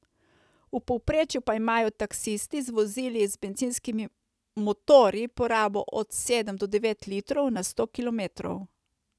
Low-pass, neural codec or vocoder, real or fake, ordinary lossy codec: none; none; real; none